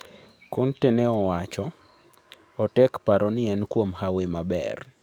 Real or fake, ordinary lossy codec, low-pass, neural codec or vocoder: fake; none; none; codec, 44.1 kHz, 7.8 kbps, DAC